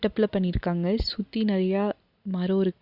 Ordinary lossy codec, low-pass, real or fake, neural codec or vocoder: Opus, 64 kbps; 5.4 kHz; real; none